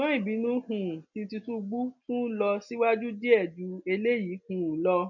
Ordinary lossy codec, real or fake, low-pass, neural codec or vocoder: none; real; 7.2 kHz; none